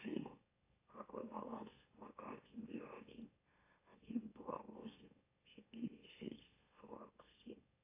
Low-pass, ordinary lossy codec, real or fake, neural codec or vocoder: 3.6 kHz; AAC, 24 kbps; fake; autoencoder, 44.1 kHz, a latent of 192 numbers a frame, MeloTTS